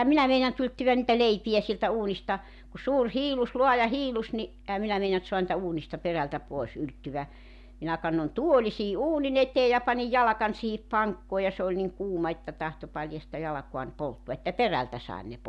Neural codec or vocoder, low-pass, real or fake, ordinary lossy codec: none; none; real; none